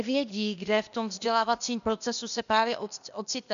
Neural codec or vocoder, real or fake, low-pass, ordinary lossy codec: codec, 16 kHz, 0.8 kbps, ZipCodec; fake; 7.2 kHz; MP3, 96 kbps